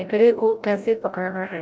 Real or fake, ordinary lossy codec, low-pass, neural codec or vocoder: fake; none; none; codec, 16 kHz, 0.5 kbps, FreqCodec, larger model